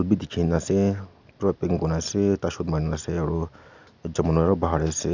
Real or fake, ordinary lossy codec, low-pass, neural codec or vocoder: real; none; 7.2 kHz; none